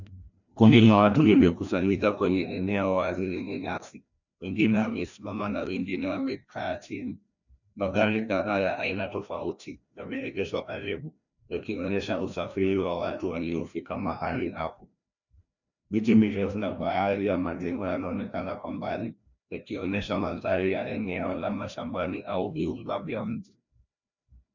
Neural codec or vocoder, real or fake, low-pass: codec, 16 kHz, 1 kbps, FreqCodec, larger model; fake; 7.2 kHz